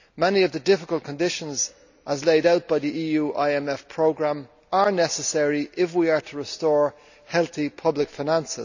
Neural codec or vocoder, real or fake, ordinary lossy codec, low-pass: none; real; none; 7.2 kHz